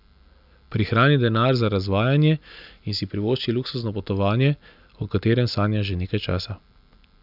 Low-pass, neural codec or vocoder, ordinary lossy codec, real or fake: 5.4 kHz; none; none; real